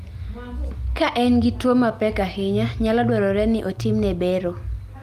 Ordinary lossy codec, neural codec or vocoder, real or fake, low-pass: Opus, 32 kbps; none; real; 14.4 kHz